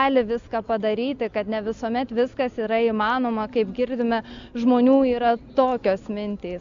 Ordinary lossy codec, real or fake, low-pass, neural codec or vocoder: Opus, 64 kbps; real; 7.2 kHz; none